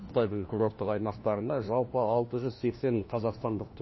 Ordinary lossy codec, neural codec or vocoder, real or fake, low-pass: MP3, 24 kbps; codec, 16 kHz, 1 kbps, FunCodec, trained on LibriTTS, 50 frames a second; fake; 7.2 kHz